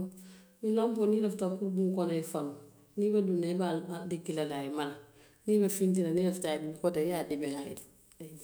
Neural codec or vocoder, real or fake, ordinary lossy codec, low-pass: autoencoder, 48 kHz, 128 numbers a frame, DAC-VAE, trained on Japanese speech; fake; none; none